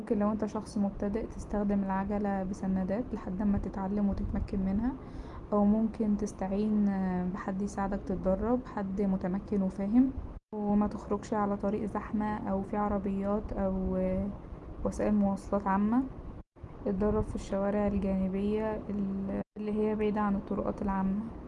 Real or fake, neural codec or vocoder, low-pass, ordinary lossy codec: real; none; 10.8 kHz; Opus, 24 kbps